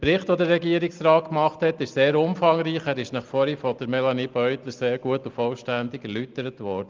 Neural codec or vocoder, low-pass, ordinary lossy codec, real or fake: none; 7.2 kHz; Opus, 32 kbps; real